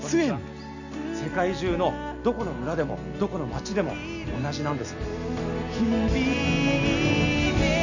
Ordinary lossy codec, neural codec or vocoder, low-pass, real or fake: none; none; 7.2 kHz; real